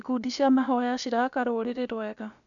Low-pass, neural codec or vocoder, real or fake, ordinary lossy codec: 7.2 kHz; codec, 16 kHz, about 1 kbps, DyCAST, with the encoder's durations; fake; none